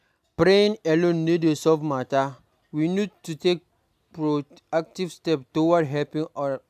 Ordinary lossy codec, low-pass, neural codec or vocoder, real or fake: AAC, 96 kbps; 14.4 kHz; none; real